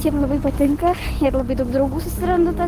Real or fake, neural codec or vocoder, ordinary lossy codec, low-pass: real; none; Opus, 16 kbps; 14.4 kHz